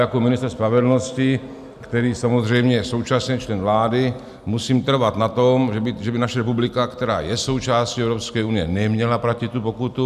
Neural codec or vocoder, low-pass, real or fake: none; 14.4 kHz; real